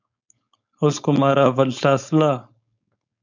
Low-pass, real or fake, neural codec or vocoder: 7.2 kHz; fake; codec, 16 kHz, 4.8 kbps, FACodec